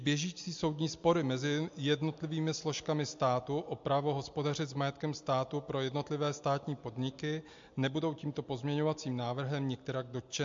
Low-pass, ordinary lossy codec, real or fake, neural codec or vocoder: 7.2 kHz; MP3, 48 kbps; real; none